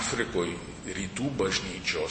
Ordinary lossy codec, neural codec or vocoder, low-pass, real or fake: MP3, 32 kbps; none; 10.8 kHz; real